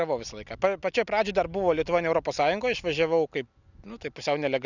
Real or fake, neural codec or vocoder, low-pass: real; none; 7.2 kHz